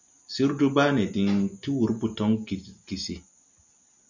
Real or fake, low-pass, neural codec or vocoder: real; 7.2 kHz; none